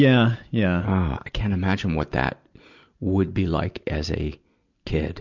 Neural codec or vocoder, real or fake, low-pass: none; real; 7.2 kHz